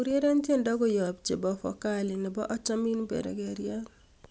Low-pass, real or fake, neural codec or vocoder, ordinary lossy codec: none; real; none; none